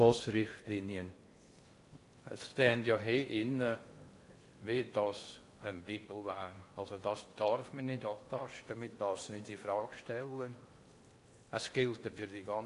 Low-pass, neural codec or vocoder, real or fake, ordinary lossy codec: 10.8 kHz; codec, 16 kHz in and 24 kHz out, 0.8 kbps, FocalCodec, streaming, 65536 codes; fake; AAC, 48 kbps